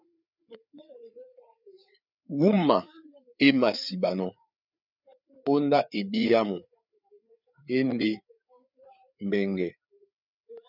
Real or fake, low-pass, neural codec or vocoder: fake; 5.4 kHz; codec, 16 kHz, 4 kbps, FreqCodec, larger model